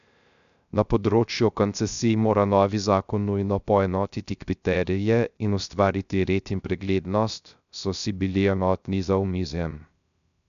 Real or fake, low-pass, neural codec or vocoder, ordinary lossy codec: fake; 7.2 kHz; codec, 16 kHz, 0.3 kbps, FocalCodec; none